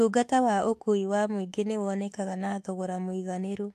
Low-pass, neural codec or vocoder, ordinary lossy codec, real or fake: 14.4 kHz; autoencoder, 48 kHz, 32 numbers a frame, DAC-VAE, trained on Japanese speech; AAC, 64 kbps; fake